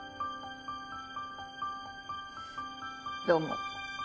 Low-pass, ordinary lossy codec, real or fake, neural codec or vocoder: none; none; real; none